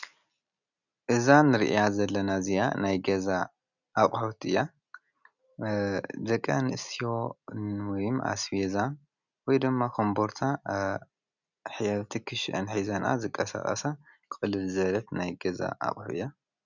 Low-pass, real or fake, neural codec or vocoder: 7.2 kHz; real; none